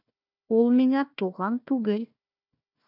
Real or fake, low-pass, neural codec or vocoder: fake; 5.4 kHz; codec, 16 kHz, 1 kbps, FunCodec, trained on Chinese and English, 50 frames a second